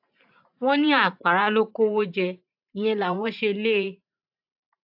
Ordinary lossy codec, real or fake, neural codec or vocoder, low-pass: AAC, 48 kbps; fake; vocoder, 44.1 kHz, 128 mel bands every 512 samples, BigVGAN v2; 5.4 kHz